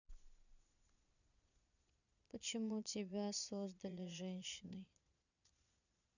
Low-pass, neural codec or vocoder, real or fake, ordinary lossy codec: 7.2 kHz; vocoder, 22.05 kHz, 80 mel bands, Vocos; fake; none